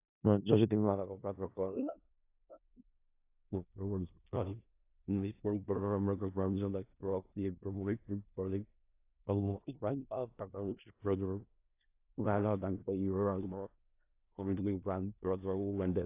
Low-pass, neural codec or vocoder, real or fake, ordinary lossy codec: 3.6 kHz; codec, 16 kHz in and 24 kHz out, 0.4 kbps, LongCat-Audio-Codec, four codebook decoder; fake; AAC, 24 kbps